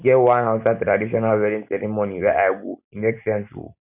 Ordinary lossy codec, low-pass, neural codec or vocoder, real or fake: none; 3.6 kHz; none; real